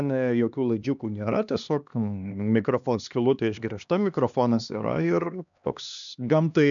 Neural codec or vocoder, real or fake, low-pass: codec, 16 kHz, 2 kbps, X-Codec, HuBERT features, trained on LibriSpeech; fake; 7.2 kHz